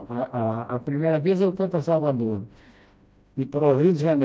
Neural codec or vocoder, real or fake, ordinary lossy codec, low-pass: codec, 16 kHz, 1 kbps, FreqCodec, smaller model; fake; none; none